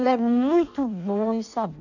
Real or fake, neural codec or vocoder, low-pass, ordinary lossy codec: fake; codec, 16 kHz in and 24 kHz out, 0.6 kbps, FireRedTTS-2 codec; 7.2 kHz; none